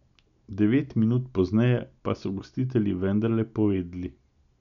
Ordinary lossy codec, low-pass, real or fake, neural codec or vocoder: none; 7.2 kHz; real; none